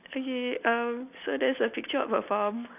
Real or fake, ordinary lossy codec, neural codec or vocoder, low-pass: real; none; none; 3.6 kHz